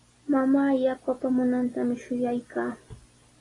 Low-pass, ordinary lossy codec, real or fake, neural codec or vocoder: 10.8 kHz; AAC, 32 kbps; real; none